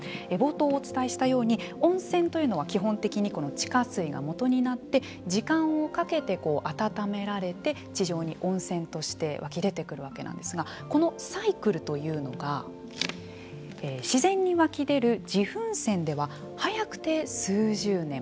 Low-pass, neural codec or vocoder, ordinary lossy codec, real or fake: none; none; none; real